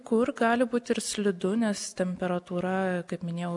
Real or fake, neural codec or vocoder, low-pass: real; none; 10.8 kHz